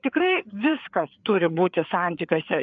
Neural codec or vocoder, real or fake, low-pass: codec, 16 kHz, 4 kbps, FreqCodec, larger model; fake; 7.2 kHz